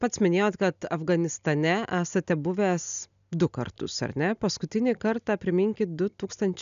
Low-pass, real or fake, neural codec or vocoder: 7.2 kHz; real; none